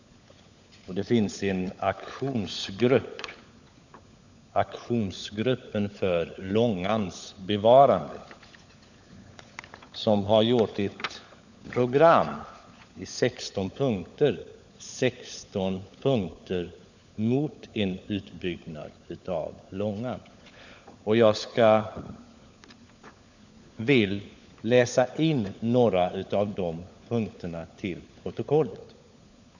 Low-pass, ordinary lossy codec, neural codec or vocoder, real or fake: 7.2 kHz; none; codec, 16 kHz, 16 kbps, FunCodec, trained on LibriTTS, 50 frames a second; fake